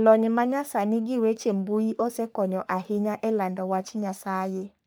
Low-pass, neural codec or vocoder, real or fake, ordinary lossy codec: none; codec, 44.1 kHz, 3.4 kbps, Pupu-Codec; fake; none